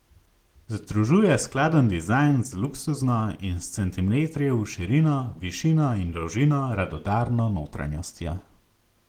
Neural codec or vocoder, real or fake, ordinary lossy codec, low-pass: none; real; Opus, 16 kbps; 19.8 kHz